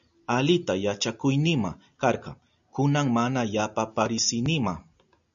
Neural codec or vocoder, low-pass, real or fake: none; 7.2 kHz; real